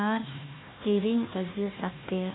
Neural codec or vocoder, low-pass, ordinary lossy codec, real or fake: codec, 16 kHz, 1 kbps, FunCodec, trained on Chinese and English, 50 frames a second; 7.2 kHz; AAC, 16 kbps; fake